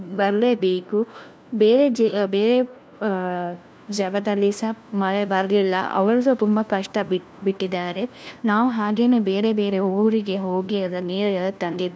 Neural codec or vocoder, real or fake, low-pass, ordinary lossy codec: codec, 16 kHz, 1 kbps, FunCodec, trained on LibriTTS, 50 frames a second; fake; none; none